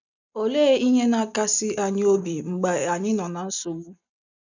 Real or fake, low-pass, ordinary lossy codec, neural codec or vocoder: real; 7.2 kHz; none; none